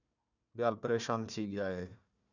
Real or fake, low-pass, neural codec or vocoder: fake; 7.2 kHz; codec, 16 kHz, 1 kbps, FunCodec, trained on Chinese and English, 50 frames a second